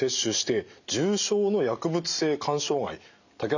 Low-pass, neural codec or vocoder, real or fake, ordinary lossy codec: 7.2 kHz; none; real; none